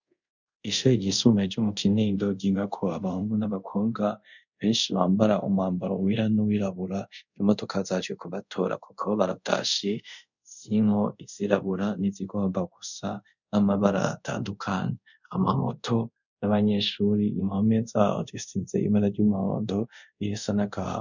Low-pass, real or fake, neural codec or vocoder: 7.2 kHz; fake; codec, 24 kHz, 0.5 kbps, DualCodec